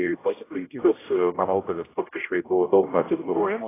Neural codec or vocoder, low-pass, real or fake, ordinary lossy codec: codec, 16 kHz, 0.5 kbps, X-Codec, HuBERT features, trained on general audio; 3.6 kHz; fake; AAC, 16 kbps